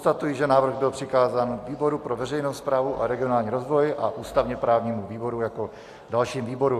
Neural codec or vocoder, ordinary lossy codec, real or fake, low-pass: none; AAC, 64 kbps; real; 14.4 kHz